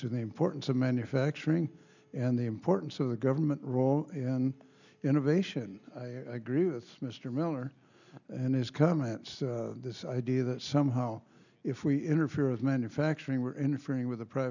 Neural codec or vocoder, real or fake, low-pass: none; real; 7.2 kHz